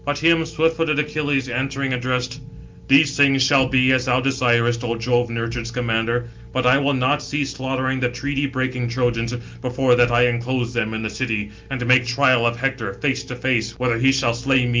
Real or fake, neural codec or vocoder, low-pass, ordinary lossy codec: real; none; 7.2 kHz; Opus, 32 kbps